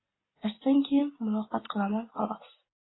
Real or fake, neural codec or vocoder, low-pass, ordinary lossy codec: real; none; 7.2 kHz; AAC, 16 kbps